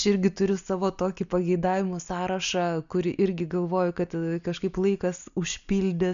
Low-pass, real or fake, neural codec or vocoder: 7.2 kHz; real; none